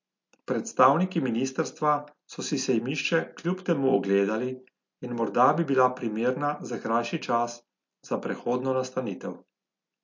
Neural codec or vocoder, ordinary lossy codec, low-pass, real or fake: none; MP3, 48 kbps; 7.2 kHz; real